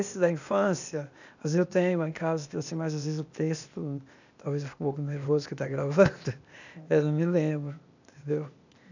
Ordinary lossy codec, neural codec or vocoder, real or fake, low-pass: none; codec, 16 kHz, 0.8 kbps, ZipCodec; fake; 7.2 kHz